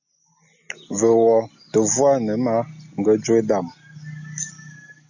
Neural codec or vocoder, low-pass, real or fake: none; 7.2 kHz; real